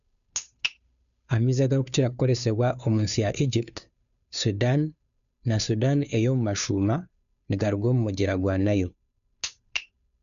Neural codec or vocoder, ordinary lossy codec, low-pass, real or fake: codec, 16 kHz, 2 kbps, FunCodec, trained on Chinese and English, 25 frames a second; none; 7.2 kHz; fake